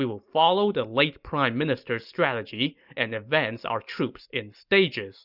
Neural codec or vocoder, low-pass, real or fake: none; 5.4 kHz; real